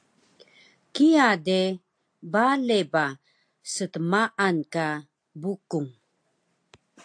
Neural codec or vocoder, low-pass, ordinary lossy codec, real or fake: none; 9.9 kHz; AAC, 64 kbps; real